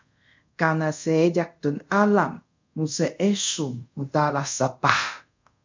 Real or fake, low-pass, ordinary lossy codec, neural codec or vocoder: fake; 7.2 kHz; MP3, 64 kbps; codec, 24 kHz, 0.5 kbps, DualCodec